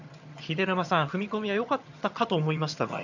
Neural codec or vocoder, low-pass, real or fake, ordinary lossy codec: vocoder, 22.05 kHz, 80 mel bands, HiFi-GAN; 7.2 kHz; fake; none